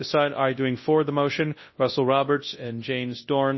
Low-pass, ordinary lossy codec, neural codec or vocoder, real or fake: 7.2 kHz; MP3, 24 kbps; codec, 24 kHz, 0.9 kbps, WavTokenizer, large speech release; fake